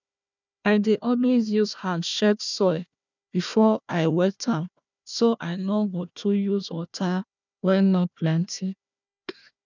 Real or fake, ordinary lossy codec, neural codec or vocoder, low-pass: fake; none; codec, 16 kHz, 1 kbps, FunCodec, trained on Chinese and English, 50 frames a second; 7.2 kHz